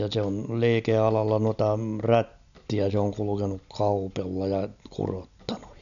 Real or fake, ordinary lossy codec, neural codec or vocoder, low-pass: real; none; none; 7.2 kHz